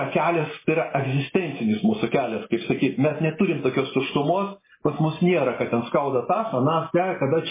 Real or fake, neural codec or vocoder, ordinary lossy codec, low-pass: real; none; MP3, 16 kbps; 3.6 kHz